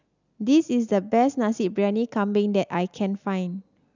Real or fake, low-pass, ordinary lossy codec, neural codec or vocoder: real; 7.2 kHz; none; none